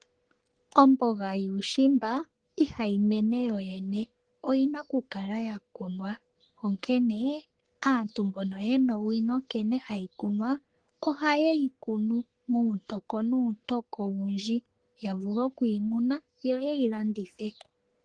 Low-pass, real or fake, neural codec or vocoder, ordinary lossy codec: 9.9 kHz; fake; codec, 44.1 kHz, 3.4 kbps, Pupu-Codec; Opus, 16 kbps